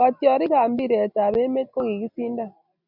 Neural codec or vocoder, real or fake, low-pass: none; real; 5.4 kHz